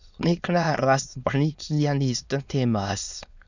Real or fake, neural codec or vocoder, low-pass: fake; autoencoder, 22.05 kHz, a latent of 192 numbers a frame, VITS, trained on many speakers; 7.2 kHz